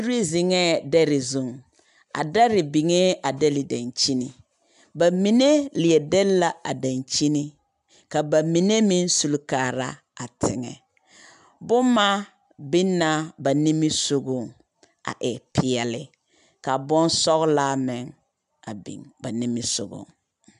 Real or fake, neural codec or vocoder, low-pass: real; none; 10.8 kHz